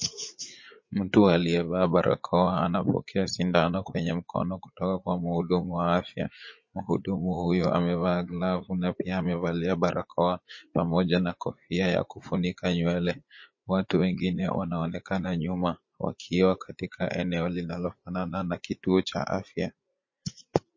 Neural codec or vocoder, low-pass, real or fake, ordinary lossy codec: vocoder, 44.1 kHz, 80 mel bands, Vocos; 7.2 kHz; fake; MP3, 32 kbps